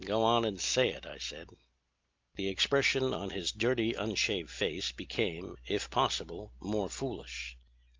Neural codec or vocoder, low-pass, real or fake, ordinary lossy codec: none; 7.2 kHz; real; Opus, 24 kbps